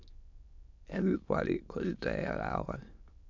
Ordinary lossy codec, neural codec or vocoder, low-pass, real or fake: MP3, 64 kbps; autoencoder, 22.05 kHz, a latent of 192 numbers a frame, VITS, trained on many speakers; 7.2 kHz; fake